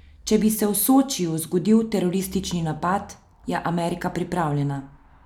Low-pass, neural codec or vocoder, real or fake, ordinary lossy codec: 19.8 kHz; none; real; none